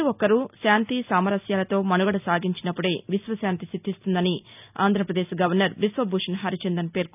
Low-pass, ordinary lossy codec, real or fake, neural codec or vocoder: 3.6 kHz; none; real; none